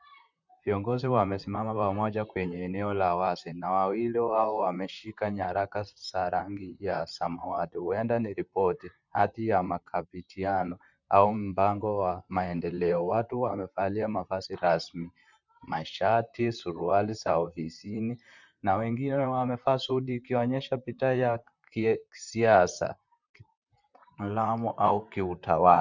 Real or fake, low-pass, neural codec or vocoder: fake; 7.2 kHz; vocoder, 44.1 kHz, 128 mel bands, Pupu-Vocoder